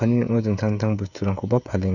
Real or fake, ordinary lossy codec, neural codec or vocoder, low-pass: fake; none; codec, 16 kHz, 16 kbps, FreqCodec, smaller model; 7.2 kHz